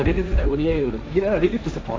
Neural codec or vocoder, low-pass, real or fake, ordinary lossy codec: codec, 16 kHz, 1.1 kbps, Voila-Tokenizer; none; fake; none